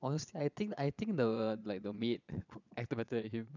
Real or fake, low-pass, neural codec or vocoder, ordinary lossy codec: fake; 7.2 kHz; vocoder, 22.05 kHz, 80 mel bands, Vocos; none